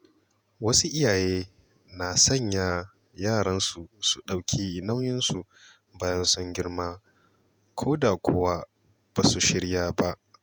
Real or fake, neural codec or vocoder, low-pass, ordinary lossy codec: fake; vocoder, 48 kHz, 128 mel bands, Vocos; none; none